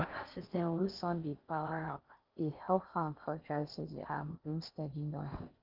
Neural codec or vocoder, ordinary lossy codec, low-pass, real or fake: codec, 16 kHz in and 24 kHz out, 0.6 kbps, FocalCodec, streaming, 4096 codes; Opus, 32 kbps; 5.4 kHz; fake